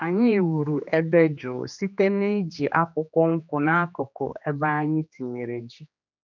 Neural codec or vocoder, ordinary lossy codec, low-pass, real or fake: codec, 16 kHz, 2 kbps, X-Codec, HuBERT features, trained on general audio; none; 7.2 kHz; fake